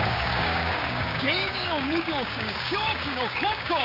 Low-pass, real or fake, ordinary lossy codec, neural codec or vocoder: 5.4 kHz; fake; none; codec, 16 kHz, 6 kbps, DAC